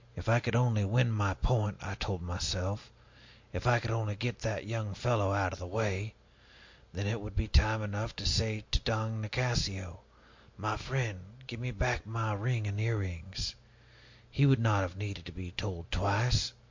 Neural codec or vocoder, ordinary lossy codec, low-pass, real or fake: none; MP3, 48 kbps; 7.2 kHz; real